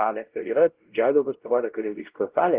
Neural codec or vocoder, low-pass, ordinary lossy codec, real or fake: codec, 16 kHz, 0.5 kbps, X-Codec, WavLM features, trained on Multilingual LibriSpeech; 3.6 kHz; Opus, 16 kbps; fake